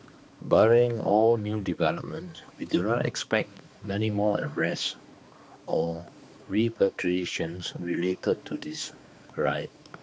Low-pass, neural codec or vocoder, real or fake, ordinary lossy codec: none; codec, 16 kHz, 2 kbps, X-Codec, HuBERT features, trained on balanced general audio; fake; none